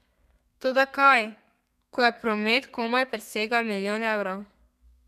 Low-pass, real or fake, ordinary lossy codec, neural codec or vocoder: 14.4 kHz; fake; none; codec, 32 kHz, 1.9 kbps, SNAC